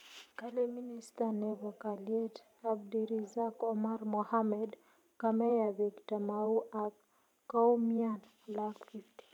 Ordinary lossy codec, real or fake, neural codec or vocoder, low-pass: none; fake; vocoder, 44.1 kHz, 128 mel bands every 512 samples, BigVGAN v2; 19.8 kHz